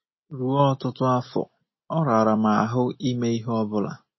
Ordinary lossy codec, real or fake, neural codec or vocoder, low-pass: MP3, 24 kbps; real; none; 7.2 kHz